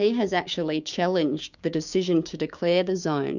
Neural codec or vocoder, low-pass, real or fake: codec, 16 kHz, 6 kbps, DAC; 7.2 kHz; fake